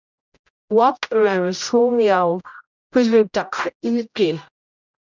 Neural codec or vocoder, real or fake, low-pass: codec, 16 kHz, 0.5 kbps, X-Codec, HuBERT features, trained on general audio; fake; 7.2 kHz